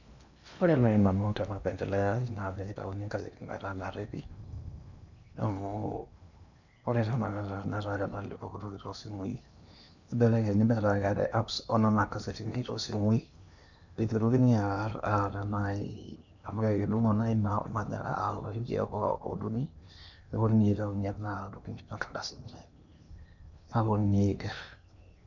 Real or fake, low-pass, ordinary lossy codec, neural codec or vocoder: fake; 7.2 kHz; none; codec, 16 kHz in and 24 kHz out, 0.8 kbps, FocalCodec, streaming, 65536 codes